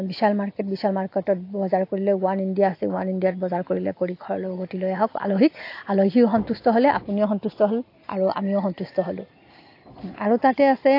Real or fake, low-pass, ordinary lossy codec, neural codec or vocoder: real; 5.4 kHz; AAC, 32 kbps; none